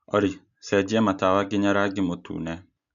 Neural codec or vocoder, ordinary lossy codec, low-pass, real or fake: none; AAC, 96 kbps; 7.2 kHz; real